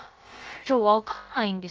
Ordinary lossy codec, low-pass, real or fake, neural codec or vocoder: Opus, 24 kbps; 7.2 kHz; fake; codec, 16 kHz, about 1 kbps, DyCAST, with the encoder's durations